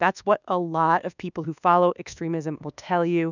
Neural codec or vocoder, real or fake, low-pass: codec, 24 kHz, 1.2 kbps, DualCodec; fake; 7.2 kHz